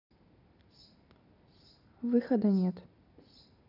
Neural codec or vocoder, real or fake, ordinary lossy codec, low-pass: none; real; none; 5.4 kHz